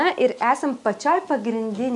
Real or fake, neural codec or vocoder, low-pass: real; none; 10.8 kHz